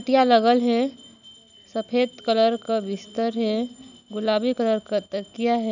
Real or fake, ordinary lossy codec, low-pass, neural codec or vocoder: real; none; 7.2 kHz; none